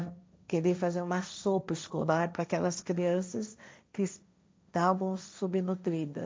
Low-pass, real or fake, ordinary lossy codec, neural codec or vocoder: none; fake; none; codec, 16 kHz, 1.1 kbps, Voila-Tokenizer